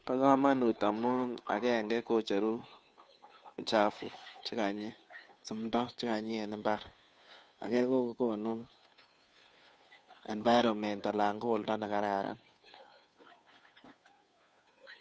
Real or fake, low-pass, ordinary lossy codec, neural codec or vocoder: fake; none; none; codec, 16 kHz, 2 kbps, FunCodec, trained on Chinese and English, 25 frames a second